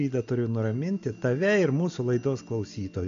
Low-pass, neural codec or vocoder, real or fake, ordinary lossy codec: 7.2 kHz; none; real; AAC, 64 kbps